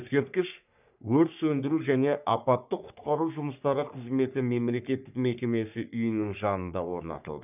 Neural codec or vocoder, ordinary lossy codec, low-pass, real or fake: codec, 44.1 kHz, 3.4 kbps, Pupu-Codec; none; 3.6 kHz; fake